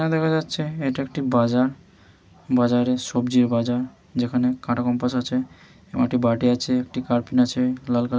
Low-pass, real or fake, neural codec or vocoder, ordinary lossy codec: none; real; none; none